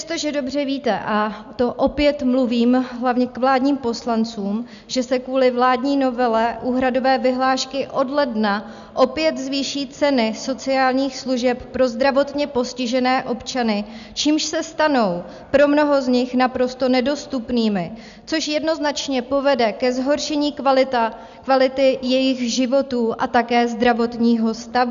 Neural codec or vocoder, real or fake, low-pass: none; real; 7.2 kHz